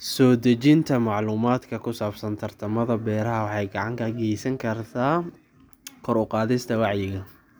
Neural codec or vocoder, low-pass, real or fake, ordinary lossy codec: none; none; real; none